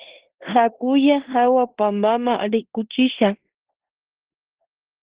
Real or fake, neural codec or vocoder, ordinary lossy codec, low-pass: fake; codec, 16 kHz in and 24 kHz out, 0.9 kbps, LongCat-Audio-Codec, four codebook decoder; Opus, 16 kbps; 3.6 kHz